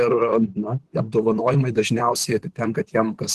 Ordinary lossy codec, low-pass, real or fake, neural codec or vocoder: Opus, 16 kbps; 14.4 kHz; fake; vocoder, 44.1 kHz, 128 mel bands, Pupu-Vocoder